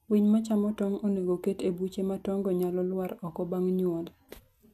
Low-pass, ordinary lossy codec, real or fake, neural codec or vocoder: 14.4 kHz; none; real; none